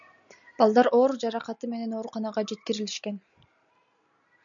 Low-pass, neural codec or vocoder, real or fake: 7.2 kHz; none; real